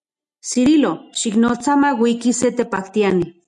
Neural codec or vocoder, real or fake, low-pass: none; real; 10.8 kHz